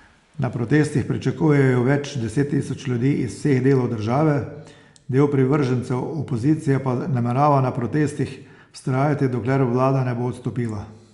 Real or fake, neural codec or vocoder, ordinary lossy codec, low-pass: real; none; Opus, 64 kbps; 10.8 kHz